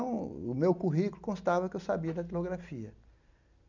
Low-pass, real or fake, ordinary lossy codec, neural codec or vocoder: 7.2 kHz; real; none; none